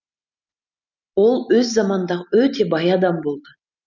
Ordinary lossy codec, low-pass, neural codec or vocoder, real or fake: none; 7.2 kHz; none; real